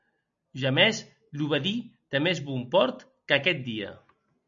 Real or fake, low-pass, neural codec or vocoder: real; 7.2 kHz; none